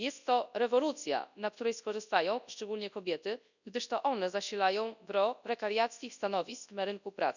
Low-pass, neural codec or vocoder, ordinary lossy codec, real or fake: 7.2 kHz; codec, 24 kHz, 0.9 kbps, WavTokenizer, large speech release; none; fake